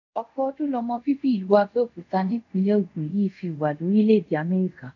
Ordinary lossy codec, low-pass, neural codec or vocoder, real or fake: none; 7.2 kHz; codec, 24 kHz, 0.5 kbps, DualCodec; fake